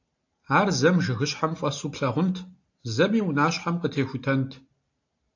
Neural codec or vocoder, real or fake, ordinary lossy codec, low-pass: none; real; AAC, 48 kbps; 7.2 kHz